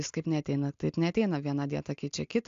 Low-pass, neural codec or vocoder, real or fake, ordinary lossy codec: 7.2 kHz; none; real; AAC, 64 kbps